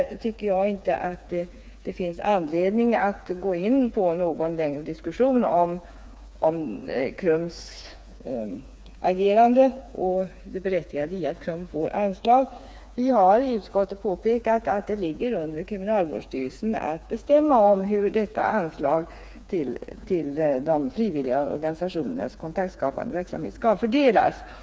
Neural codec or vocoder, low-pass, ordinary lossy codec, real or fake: codec, 16 kHz, 4 kbps, FreqCodec, smaller model; none; none; fake